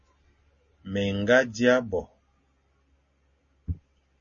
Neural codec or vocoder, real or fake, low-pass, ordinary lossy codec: none; real; 7.2 kHz; MP3, 32 kbps